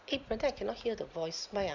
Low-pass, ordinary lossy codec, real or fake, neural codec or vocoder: 7.2 kHz; none; real; none